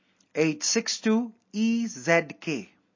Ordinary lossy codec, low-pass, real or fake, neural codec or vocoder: MP3, 32 kbps; 7.2 kHz; real; none